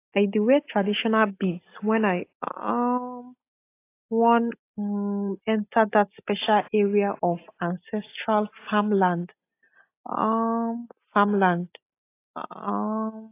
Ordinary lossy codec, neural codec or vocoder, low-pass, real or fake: AAC, 24 kbps; none; 3.6 kHz; real